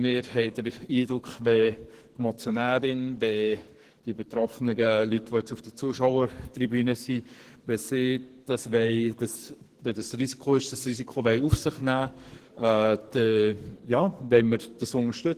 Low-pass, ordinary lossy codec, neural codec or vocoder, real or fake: 14.4 kHz; Opus, 16 kbps; codec, 44.1 kHz, 2.6 kbps, SNAC; fake